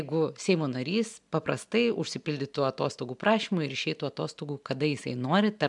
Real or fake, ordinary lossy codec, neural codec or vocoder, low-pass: real; MP3, 96 kbps; none; 10.8 kHz